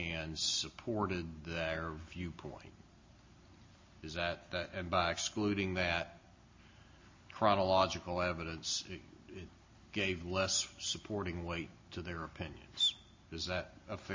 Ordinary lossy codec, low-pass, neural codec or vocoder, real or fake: MP3, 32 kbps; 7.2 kHz; none; real